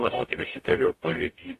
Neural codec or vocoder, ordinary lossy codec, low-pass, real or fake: codec, 44.1 kHz, 0.9 kbps, DAC; AAC, 48 kbps; 14.4 kHz; fake